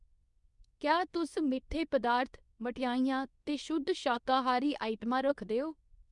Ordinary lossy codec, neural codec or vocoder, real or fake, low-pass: none; codec, 24 kHz, 0.9 kbps, WavTokenizer, medium speech release version 2; fake; 10.8 kHz